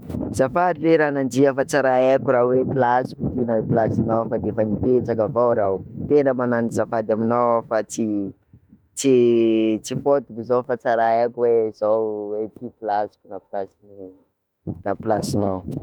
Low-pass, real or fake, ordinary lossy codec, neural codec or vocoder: 19.8 kHz; fake; none; autoencoder, 48 kHz, 32 numbers a frame, DAC-VAE, trained on Japanese speech